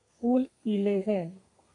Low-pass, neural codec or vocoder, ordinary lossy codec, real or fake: 10.8 kHz; codec, 32 kHz, 1.9 kbps, SNAC; AAC, 48 kbps; fake